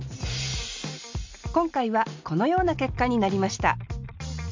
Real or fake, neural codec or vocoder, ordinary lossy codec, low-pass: real; none; MP3, 64 kbps; 7.2 kHz